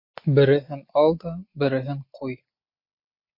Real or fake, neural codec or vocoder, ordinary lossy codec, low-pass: real; none; MP3, 32 kbps; 5.4 kHz